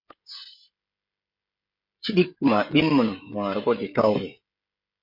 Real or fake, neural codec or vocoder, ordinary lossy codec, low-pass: fake; codec, 16 kHz, 16 kbps, FreqCodec, smaller model; MP3, 32 kbps; 5.4 kHz